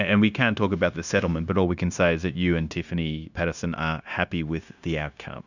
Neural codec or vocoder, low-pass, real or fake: codec, 16 kHz, 0.9 kbps, LongCat-Audio-Codec; 7.2 kHz; fake